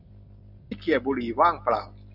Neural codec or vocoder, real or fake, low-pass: none; real; 5.4 kHz